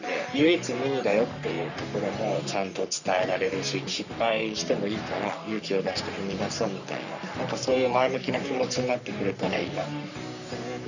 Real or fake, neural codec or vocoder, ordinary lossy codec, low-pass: fake; codec, 44.1 kHz, 3.4 kbps, Pupu-Codec; none; 7.2 kHz